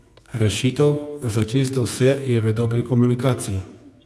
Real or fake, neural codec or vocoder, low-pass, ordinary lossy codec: fake; codec, 24 kHz, 0.9 kbps, WavTokenizer, medium music audio release; none; none